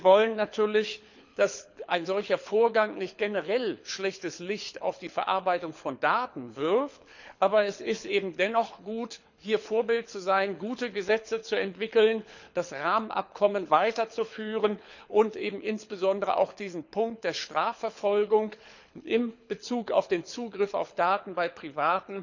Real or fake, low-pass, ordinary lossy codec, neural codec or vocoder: fake; 7.2 kHz; none; codec, 24 kHz, 6 kbps, HILCodec